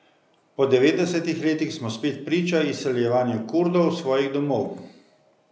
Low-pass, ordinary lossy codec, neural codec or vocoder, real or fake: none; none; none; real